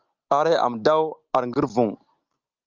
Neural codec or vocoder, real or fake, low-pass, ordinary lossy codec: none; real; 7.2 kHz; Opus, 24 kbps